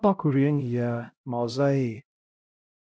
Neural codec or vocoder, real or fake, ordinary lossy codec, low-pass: codec, 16 kHz, 0.5 kbps, X-Codec, HuBERT features, trained on LibriSpeech; fake; none; none